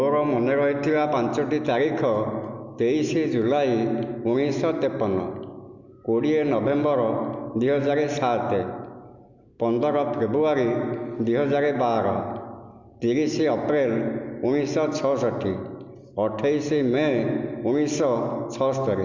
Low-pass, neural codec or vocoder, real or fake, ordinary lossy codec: 7.2 kHz; none; real; Opus, 64 kbps